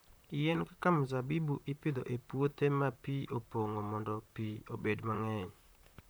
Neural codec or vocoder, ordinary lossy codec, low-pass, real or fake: vocoder, 44.1 kHz, 128 mel bands, Pupu-Vocoder; none; none; fake